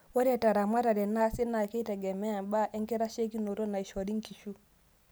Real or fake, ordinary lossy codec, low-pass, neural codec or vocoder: real; none; none; none